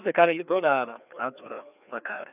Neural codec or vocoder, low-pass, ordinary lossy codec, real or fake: codec, 16 kHz, 2 kbps, FreqCodec, larger model; 3.6 kHz; none; fake